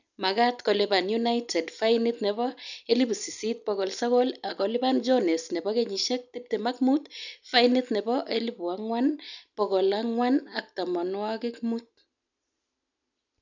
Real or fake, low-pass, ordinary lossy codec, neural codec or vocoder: real; 7.2 kHz; none; none